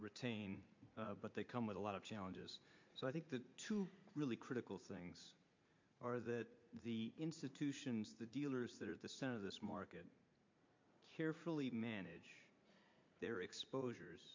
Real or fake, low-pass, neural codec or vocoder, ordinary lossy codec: fake; 7.2 kHz; vocoder, 44.1 kHz, 80 mel bands, Vocos; MP3, 48 kbps